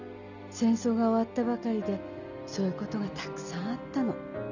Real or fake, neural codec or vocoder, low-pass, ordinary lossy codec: real; none; 7.2 kHz; none